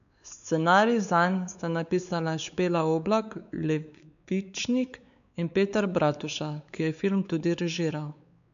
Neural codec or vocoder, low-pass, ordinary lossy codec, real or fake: codec, 16 kHz, 4 kbps, X-Codec, WavLM features, trained on Multilingual LibriSpeech; 7.2 kHz; none; fake